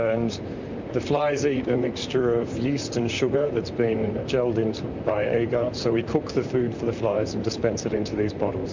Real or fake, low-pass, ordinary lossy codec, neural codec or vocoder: fake; 7.2 kHz; MP3, 64 kbps; vocoder, 44.1 kHz, 128 mel bands, Pupu-Vocoder